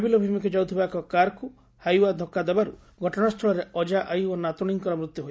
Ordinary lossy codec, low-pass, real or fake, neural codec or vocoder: none; none; real; none